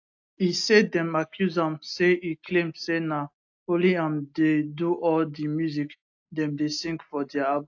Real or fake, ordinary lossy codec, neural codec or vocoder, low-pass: real; AAC, 48 kbps; none; 7.2 kHz